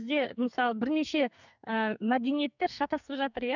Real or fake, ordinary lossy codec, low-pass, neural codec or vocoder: fake; none; 7.2 kHz; codec, 44.1 kHz, 2.6 kbps, SNAC